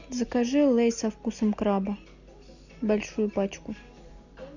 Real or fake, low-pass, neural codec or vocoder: real; 7.2 kHz; none